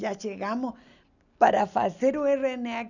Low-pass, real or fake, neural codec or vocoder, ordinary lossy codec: 7.2 kHz; real; none; none